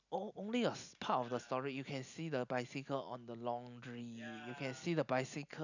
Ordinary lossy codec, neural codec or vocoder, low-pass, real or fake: none; none; 7.2 kHz; real